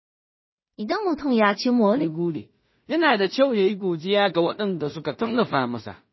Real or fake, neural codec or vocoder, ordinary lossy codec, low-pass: fake; codec, 16 kHz in and 24 kHz out, 0.4 kbps, LongCat-Audio-Codec, two codebook decoder; MP3, 24 kbps; 7.2 kHz